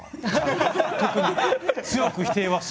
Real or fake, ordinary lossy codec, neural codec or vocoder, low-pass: real; none; none; none